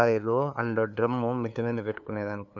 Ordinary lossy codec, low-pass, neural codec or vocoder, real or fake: none; 7.2 kHz; codec, 16 kHz, 2 kbps, FunCodec, trained on LibriTTS, 25 frames a second; fake